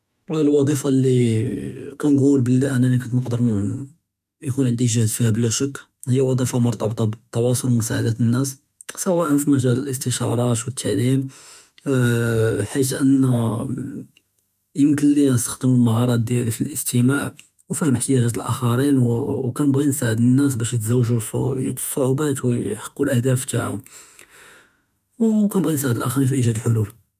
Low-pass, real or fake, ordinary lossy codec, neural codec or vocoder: 14.4 kHz; fake; none; autoencoder, 48 kHz, 32 numbers a frame, DAC-VAE, trained on Japanese speech